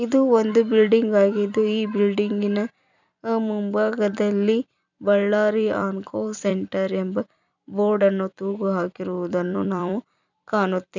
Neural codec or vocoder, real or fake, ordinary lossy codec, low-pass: vocoder, 44.1 kHz, 128 mel bands every 256 samples, BigVGAN v2; fake; none; 7.2 kHz